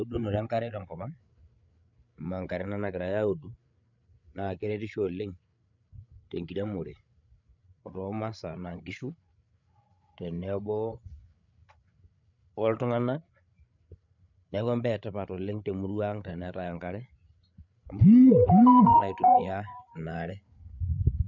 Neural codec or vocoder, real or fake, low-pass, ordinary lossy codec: codec, 16 kHz, 8 kbps, FreqCodec, larger model; fake; 7.2 kHz; none